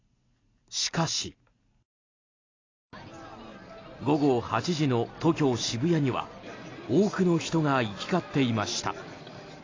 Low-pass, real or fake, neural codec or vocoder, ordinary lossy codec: 7.2 kHz; real; none; AAC, 32 kbps